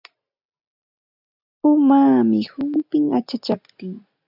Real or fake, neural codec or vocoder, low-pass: real; none; 5.4 kHz